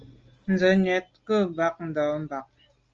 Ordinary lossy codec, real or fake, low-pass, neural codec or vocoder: Opus, 24 kbps; real; 7.2 kHz; none